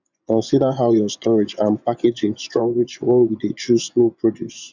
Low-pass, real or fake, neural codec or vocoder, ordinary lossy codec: 7.2 kHz; fake; vocoder, 24 kHz, 100 mel bands, Vocos; none